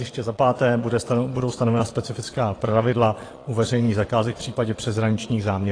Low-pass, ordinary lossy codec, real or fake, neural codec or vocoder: 9.9 kHz; AAC, 32 kbps; fake; vocoder, 22.05 kHz, 80 mel bands, Vocos